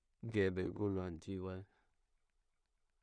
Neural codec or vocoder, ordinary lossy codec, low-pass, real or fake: codec, 16 kHz in and 24 kHz out, 0.4 kbps, LongCat-Audio-Codec, two codebook decoder; none; 9.9 kHz; fake